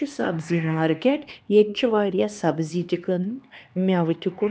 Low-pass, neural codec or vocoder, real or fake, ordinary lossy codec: none; codec, 16 kHz, 2 kbps, X-Codec, HuBERT features, trained on LibriSpeech; fake; none